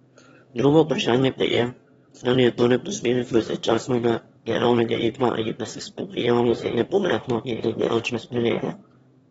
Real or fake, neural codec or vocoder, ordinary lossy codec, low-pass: fake; autoencoder, 22.05 kHz, a latent of 192 numbers a frame, VITS, trained on one speaker; AAC, 24 kbps; 9.9 kHz